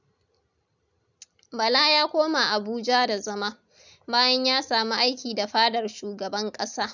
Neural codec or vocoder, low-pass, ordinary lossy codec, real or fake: none; 7.2 kHz; none; real